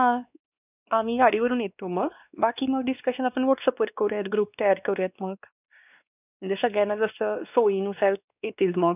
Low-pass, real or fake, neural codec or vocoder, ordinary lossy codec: 3.6 kHz; fake; codec, 16 kHz, 2 kbps, X-Codec, WavLM features, trained on Multilingual LibriSpeech; none